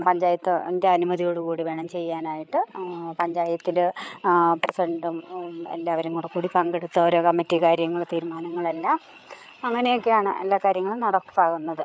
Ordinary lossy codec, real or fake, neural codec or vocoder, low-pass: none; fake; codec, 16 kHz, 8 kbps, FreqCodec, larger model; none